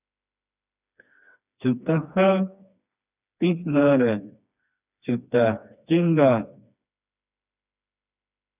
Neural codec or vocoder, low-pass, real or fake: codec, 16 kHz, 2 kbps, FreqCodec, smaller model; 3.6 kHz; fake